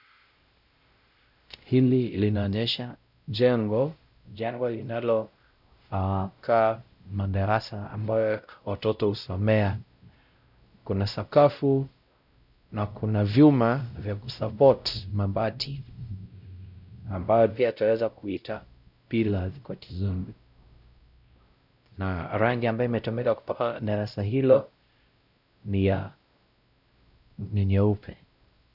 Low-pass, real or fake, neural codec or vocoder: 5.4 kHz; fake; codec, 16 kHz, 0.5 kbps, X-Codec, WavLM features, trained on Multilingual LibriSpeech